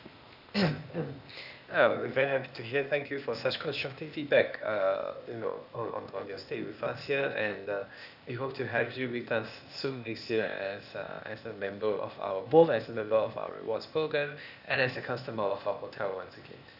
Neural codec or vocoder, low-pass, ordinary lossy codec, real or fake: codec, 16 kHz, 0.8 kbps, ZipCodec; 5.4 kHz; none; fake